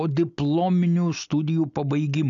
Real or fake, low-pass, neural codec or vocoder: real; 7.2 kHz; none